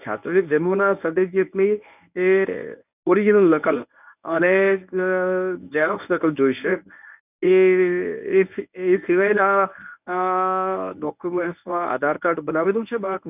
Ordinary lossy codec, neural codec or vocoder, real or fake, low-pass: none; codec, 24 kHz, 0.9 kbps, WavTokenizer, medium speech release version 1; fake; 3.6 kHz